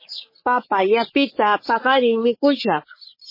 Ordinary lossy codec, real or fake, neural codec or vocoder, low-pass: MP3, 24 kbps; fake; codec, 44.1 kHz, 3.4 kbps, Pupu-Codec; 5.4 kHz